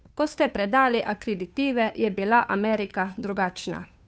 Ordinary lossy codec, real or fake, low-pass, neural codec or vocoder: none; fake; none; codec, 16 kHz, 2 kbps, FunCodec, trained on Chinese and English, 25 frames a second